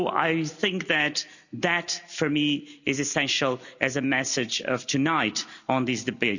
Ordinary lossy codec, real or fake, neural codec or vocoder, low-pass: none; real; none; 7.2 kHz